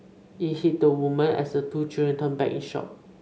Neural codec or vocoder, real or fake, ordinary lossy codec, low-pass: none; real; none; none